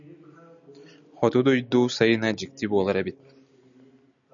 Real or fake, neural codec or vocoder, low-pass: real; none; 7.2 kHz